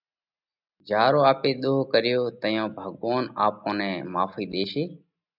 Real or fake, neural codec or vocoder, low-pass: real; none; 5.4 kHz